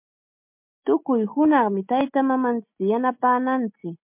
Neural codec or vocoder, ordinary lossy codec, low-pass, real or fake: none; MP3, 32 kbps; 3.6 kHz; real